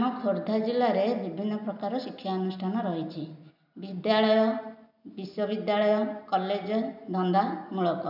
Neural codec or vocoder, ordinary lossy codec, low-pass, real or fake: none; none; 5.4 kHz; real